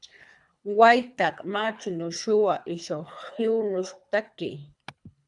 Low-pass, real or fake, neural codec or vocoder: 10.8 kHz; fake; codec, 24 kHz, 3 kbps, HILCodec